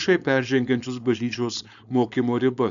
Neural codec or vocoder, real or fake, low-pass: codec, 16 kHz, 4.8 kbps, FACodec; fake; 7.2 kHz